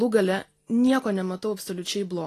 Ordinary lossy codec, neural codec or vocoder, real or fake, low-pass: AAC, 48 kbps; none; real; 14.4 kHz